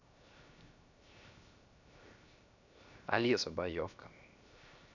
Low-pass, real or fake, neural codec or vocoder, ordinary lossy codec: 7.2 kHz; fake; codec, 16 kHz, 0.7 kbps, FocalCodec; none